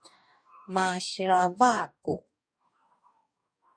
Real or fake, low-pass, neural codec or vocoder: fake; 9.9 kHz; codec, 44.1 kHz, 2.6 kbps, DAC